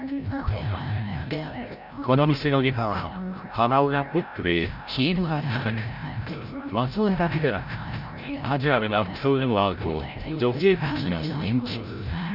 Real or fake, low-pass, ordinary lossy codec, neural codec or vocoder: fake; 5.4 kHz; none; codec, 16 kHz, 0.5 kbps, FreqCodec, larger model